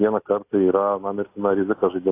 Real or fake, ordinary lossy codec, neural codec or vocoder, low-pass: real; AAC, 24 kbps; none; 3.6 kHz